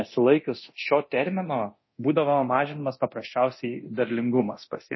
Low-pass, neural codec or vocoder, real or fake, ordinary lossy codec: 7.2 kHz; codec, 24 kHz, 0.9 kbps, DualCodec; fake; MP3, 24 kbps